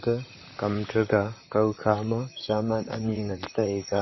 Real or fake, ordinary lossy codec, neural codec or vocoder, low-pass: fake; MP3, 24 kbps; vocoder, 44.1 kHz, 128 mel bands, Pupu-Vocoder; 7.2 kHz